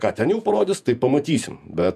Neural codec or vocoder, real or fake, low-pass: vocoder, 48 kHz, 128 mel bands, Vocos; fake; 14.4 kHz